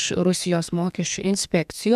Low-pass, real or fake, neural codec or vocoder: 14.4 kHz; fake; codec, 32 kHz, 1.9 kbps, SNAC